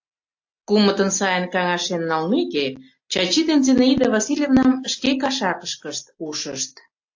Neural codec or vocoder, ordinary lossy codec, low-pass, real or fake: none; AAC, 48 kbps; 7.2 kHz; real